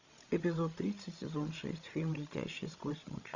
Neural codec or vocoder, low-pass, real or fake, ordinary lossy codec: codec, 16 kHz, 16 kbps, FreqCodec, larger model; 7.2 kHz; fake; Opus, 64 kbps